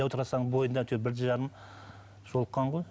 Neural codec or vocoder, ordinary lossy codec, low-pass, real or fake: none; none; none; real